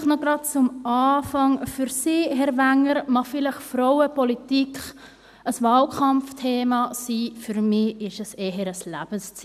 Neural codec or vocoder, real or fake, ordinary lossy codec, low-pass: none; real; none; 14.4 kHz